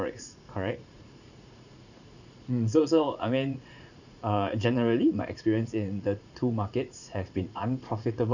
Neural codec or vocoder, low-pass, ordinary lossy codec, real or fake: vocoder, 44.1 kHz, 80 mel bands, Vocos; 7.2 kHz; none; fake